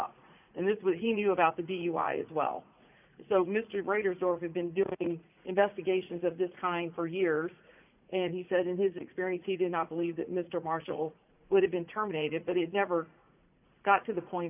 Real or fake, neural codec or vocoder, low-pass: fake; vocoder, 44.1 kHz, 80 mel bands, Vocos; 3.6 kHz